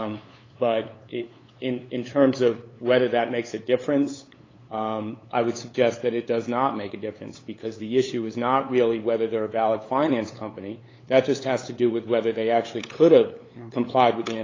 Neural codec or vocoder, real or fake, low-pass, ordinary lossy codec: codec, 16 kHz, 8 kbps, FunCodec, trained on LibriTTS, 25 frames a second; fake; 7.2 kHz; AAC, 32 kbps